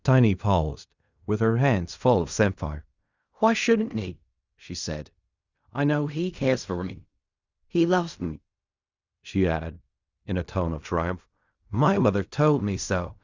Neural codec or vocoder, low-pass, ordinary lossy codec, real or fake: codec, 16 kHz in and 24 kHz out, 0.4 kbps, LongCat-Audio-Codec, fine tuned four codebook decoder; 7.2 kHz; Opus, 64 kbps; fake